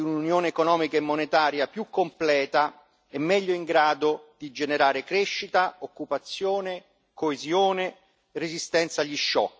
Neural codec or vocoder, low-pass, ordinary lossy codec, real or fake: none; none; none; real